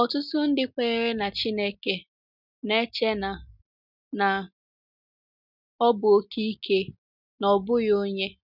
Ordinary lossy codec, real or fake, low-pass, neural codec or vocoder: none; real; 5.4 kHz; none